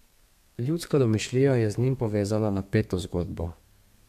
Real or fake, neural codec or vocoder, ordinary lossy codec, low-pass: fake; codec, 32 kHz, 1.9 kbps, SNAC; MP3, 96 kbps; 14.4 kHz